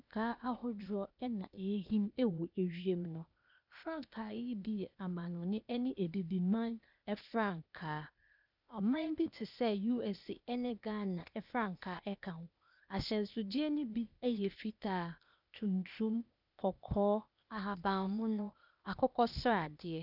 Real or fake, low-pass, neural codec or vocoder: fake; 5.4 kHz; codec, 16 kHz, 0.8 kbps, ZipCodec